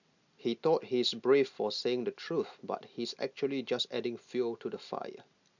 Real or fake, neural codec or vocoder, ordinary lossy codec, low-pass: real; none; none; 7.2 kHz